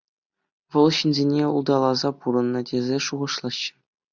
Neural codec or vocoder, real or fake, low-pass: none; real; 7.2 kHz